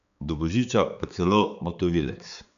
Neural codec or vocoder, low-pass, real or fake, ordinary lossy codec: codec, 16 kHz, 4 kbps, X-Codec, HuBERT features, trained on balanced general audio; 7.2 kHz; fake; none